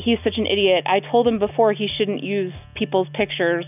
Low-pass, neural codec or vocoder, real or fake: 3.6 kHz; none; real